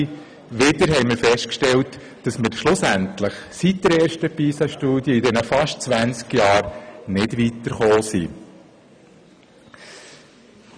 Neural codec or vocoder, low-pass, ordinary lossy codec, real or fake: none; 9.9 kHz; none; real